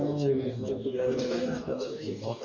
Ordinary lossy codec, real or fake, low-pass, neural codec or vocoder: MP3, 64 kbps; fake; 7.2 kHz; codec, 44.1 kHz, 2.6 kbps, DAC